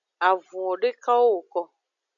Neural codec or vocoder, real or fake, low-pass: none; real; 7.2 kHz